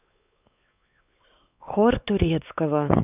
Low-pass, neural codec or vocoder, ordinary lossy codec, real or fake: 3.6 kHz; codec, 16 kHz, 4 kbps, X-Codec, WavLM features, trained on Multilingual LibriSpeech; none; fake